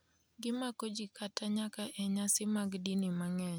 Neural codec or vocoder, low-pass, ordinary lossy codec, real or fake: none; none; none; real